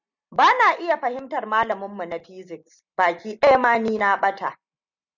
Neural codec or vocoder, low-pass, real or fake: none; 7.2 kHz; real